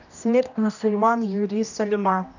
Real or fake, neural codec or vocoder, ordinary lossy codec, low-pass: fake; codec, 16 kHz, 1 kbps, X-Codec, HuBERT features, trained on general audio; none; 7.2 kHz